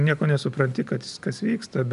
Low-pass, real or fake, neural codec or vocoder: 10.8 kHz; real; none